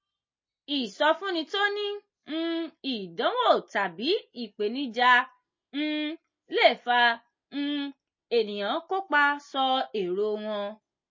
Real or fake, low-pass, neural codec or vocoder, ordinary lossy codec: real; 7.2 kHz; none; MP3, 32 kbps